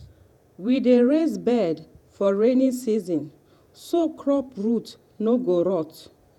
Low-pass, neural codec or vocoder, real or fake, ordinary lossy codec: 19.8 kHz; vocoder, 48 kHz, 128 mel bands, Vocos; fake; none